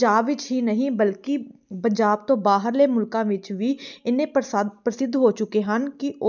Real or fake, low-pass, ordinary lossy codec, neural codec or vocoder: real; 7.2 kHz; none; none